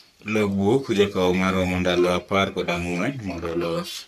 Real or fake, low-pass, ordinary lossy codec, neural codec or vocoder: fake; 14.4 kHz; none; codec, 44.1 kHz, 3.4 kbps, Pupu-Codec